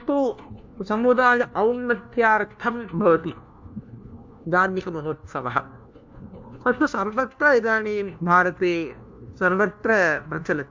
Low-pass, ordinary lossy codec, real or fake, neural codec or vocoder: 7.2 kHz; MP3, 64 kbps; fake; codec, 16 kHz, 1 kbps, FunCodec, trained on LibriTTS, 50 frames a second